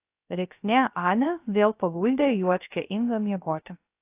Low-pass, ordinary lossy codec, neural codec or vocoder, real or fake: 3.6 kHz; AAC, 24 kbps; codec, 16 kHz, 0.3 kbps, FocalCodec; fake